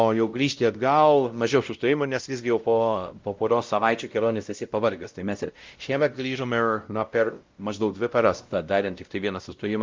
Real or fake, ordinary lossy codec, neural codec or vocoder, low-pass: fake; Opus, 24 kbps; codec, 16 kHz, 0.5 kbps, X-Codec, WavLM features, trained on Multilingual LibriSpeech; 7.2 kHz